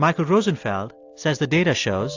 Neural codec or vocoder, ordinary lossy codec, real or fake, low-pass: none; AAC, 48 kbps; real; 7.2 kHz